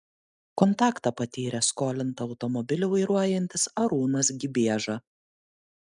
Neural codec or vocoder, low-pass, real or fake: none; 10.8 kHz; real